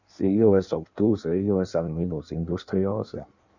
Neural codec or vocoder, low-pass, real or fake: codec, 16 kHz in and 24 kHz out, 1.1 kbps, FireRedTTS-2 codec; 7.2 kHz; fake